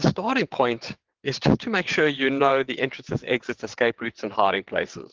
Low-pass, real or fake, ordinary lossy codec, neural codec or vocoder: 7.2 kHz; fake; Opus, 16 kbps; vocoder, 22.05 kHz, 80 mel bands, WaveNeXt